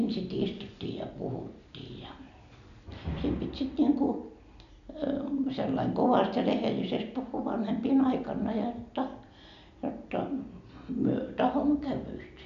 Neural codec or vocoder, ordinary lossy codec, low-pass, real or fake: none; none; 7.2 kHz; real